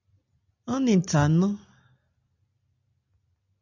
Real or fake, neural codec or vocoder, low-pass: real; none; 7.2 kHz